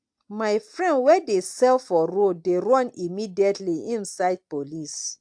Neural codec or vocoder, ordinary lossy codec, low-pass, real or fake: none; AAC, 64 kbps; 9.9 kHz; real